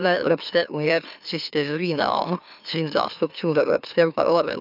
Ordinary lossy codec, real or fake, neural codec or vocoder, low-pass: none; fake; autoencoder, 44.1 kHz, a latent of 192 numbers a frame, MeloTTS; 5.4 kHz